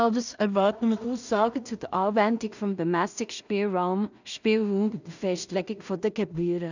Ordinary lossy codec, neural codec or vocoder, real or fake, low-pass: none; codec, 16 kHz in and 24 kHz out, 0.4 kbps, LongCat-Audio-Codec, two codebook decoder; fake; 7.2 kHz